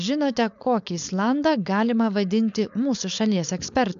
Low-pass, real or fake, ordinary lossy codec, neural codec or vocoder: 7.2 kHz; fake; AAC, 96 kbps; codec, 16 kHz, 4.8 kbps, FACodec